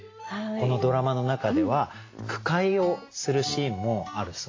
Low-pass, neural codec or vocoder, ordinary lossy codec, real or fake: 7.2 kHz; none; AAC, 32 kbps; real